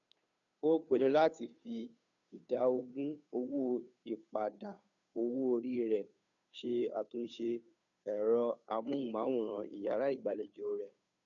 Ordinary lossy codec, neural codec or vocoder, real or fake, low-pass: none; codec, 16 kHz, 2 kbps, FunCodec, trained on Chinese and English, 25 frames a second; fake; 7.2 kHz